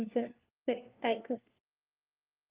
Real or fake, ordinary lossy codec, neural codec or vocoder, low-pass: fake; Opus, 24 kbps; codec, 16 kHz, 1 kbps, FunCodec, trained on LibriTTS, 50 frames a second; 3.6 kHz